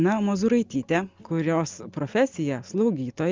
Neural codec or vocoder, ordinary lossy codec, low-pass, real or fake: none; Opus, 32 kbps; 7.2 kHz; real